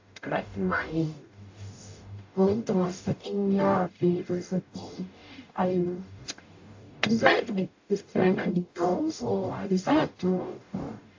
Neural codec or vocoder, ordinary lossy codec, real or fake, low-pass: codec, 44.1 kHz, 0.9 kbps, DAC; AAC, 48 kbps; fake; 7.2 kHz